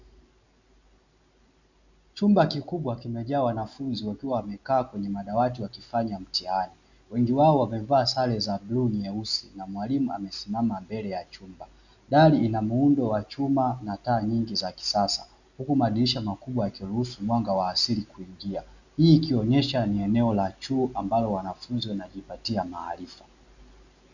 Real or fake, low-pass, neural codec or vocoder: real; 7.2 kHz; none